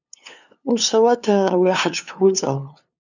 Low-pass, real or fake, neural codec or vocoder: 7.2 kHz; fake; codec, 16 kHz, 2 kbps, FunCodec, trained on LibriTTS, 25 frames a second